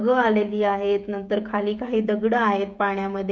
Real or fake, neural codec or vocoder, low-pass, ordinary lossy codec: fake; codec, 16 kHz, 16 kbps, FreqCodec, smaller model; none; none